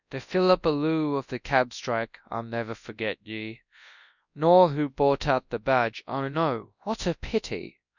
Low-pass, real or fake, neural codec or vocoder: 7.2 kHz; fake; codec, 24 kHz, 0.9 kbps, WavTokenizer, large speech release